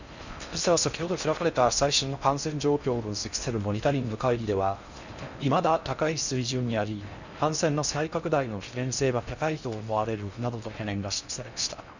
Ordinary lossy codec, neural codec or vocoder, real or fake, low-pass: none; codec, 16 kHz in and 24 kHz out, 0.6 kbps, FocalCodec, streaming, 4096 codes; fake; 7.2 kHz